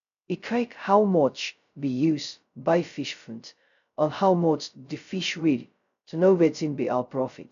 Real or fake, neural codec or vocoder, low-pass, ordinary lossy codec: fake; codec, 16 kHz, 0.2 kbps, FocalCodec; 7.2 kHz; none